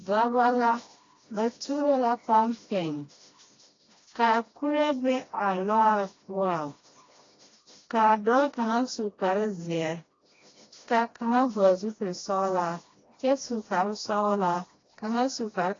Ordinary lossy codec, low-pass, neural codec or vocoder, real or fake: AAC, 32 kbps; 7.2 kHz; codec, 16 kHz, 1 kbps, FreqCodec, smaller model; fake